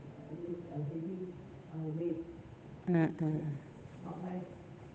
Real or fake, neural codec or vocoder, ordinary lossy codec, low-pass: fake; codec, 16 kHz, 8 kbps, FunCodec, trained on Chinese and English, 25 frames a second; none; none